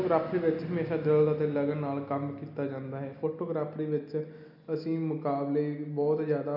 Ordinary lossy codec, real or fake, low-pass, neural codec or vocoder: none; real; 5.4 kHz; none